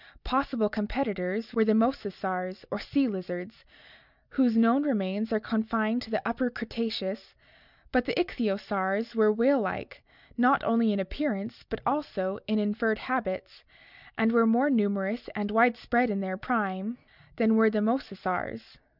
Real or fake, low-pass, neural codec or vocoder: real; 5.4 kHz; none